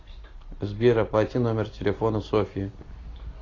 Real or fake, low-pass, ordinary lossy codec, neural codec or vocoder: real; 7.2 kHz; AAC, 32 kbps; none